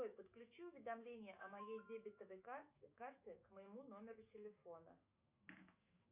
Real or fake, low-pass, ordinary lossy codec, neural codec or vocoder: fake; 3.6 kHz; Opus, 64 kbps; autoencoder, 48 kHz, 128 numbers a frame, DAC-VAE, trained on Japanese speech